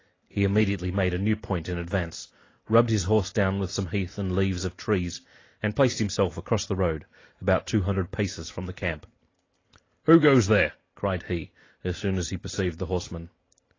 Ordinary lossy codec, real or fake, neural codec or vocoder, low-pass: AAC, 32 kbps; real; none; 7.2 kHz